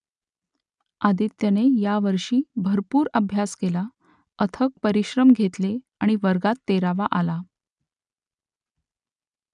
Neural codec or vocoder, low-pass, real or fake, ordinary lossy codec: none; 10.8 kHz; real; none